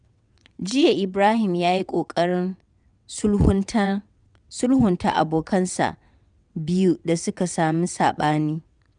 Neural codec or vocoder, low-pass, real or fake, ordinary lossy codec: vocoder, 22.05 kHz, 80 mel bands, WaveNeXt; 9.9 kHz; fake; none